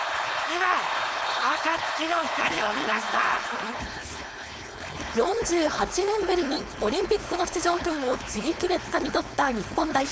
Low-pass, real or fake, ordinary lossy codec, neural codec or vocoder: none; fake; none; codec, 16 kHz, 4.8 kbps, FACodec